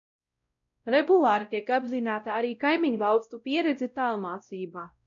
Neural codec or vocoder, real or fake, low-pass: codec, 16 kHz, 0.5 kbps, X-Codec, WavLM features, trained on Multilingual LibriSpeech; fake; 7.2 kHz